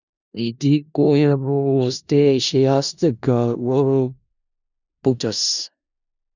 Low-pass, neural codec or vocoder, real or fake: 7.2 kHz; codec, 16 kHz in and 24 kHz out, 0.4 kbps, LongCat-Audio-Codec, four codebook decoder; fake